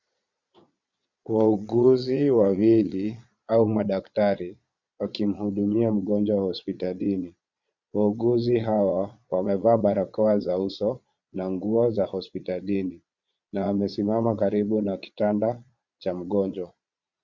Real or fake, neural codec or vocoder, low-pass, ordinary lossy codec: fake; vocoder, 22.05 kHz, 80 mel bands, Vocos; 7.2 kHz; Opus, 64 kbps